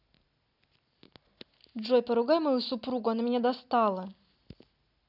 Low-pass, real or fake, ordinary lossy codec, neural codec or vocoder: 5.4 kHz; real; none; none